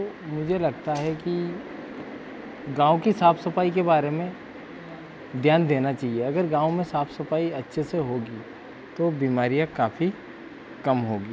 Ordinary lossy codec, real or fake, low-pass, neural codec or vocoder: none; real; none; none